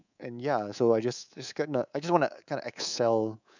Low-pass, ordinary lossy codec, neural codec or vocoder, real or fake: 7.2 kHz; none; codec, 24 kHz, 3.1 kbps, DualCodec; fake